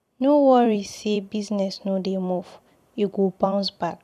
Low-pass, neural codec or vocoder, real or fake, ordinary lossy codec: 14.4 kHz; vocoder, 44.1 kHz, 128 mel bands every 256 samples, BigVGAN v2; fake; none